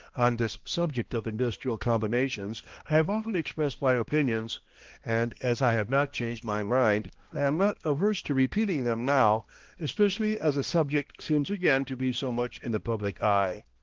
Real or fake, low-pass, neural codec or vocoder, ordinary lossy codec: fake; 7.2 kHz; codec, 16 kHz, 1 kbps, X-Codec, HuBERT features, trained on balanced general audio; Opus, 16 kbps